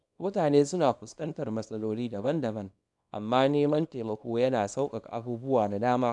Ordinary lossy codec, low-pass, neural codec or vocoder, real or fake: none; 10.8 kHz; codec, 24 kHz, 0.9 kbps, WavTokenizer, small release; fake